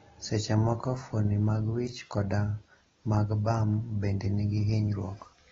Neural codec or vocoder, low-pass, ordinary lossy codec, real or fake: none; 7.2 kHz; AAC, 24 kbps; real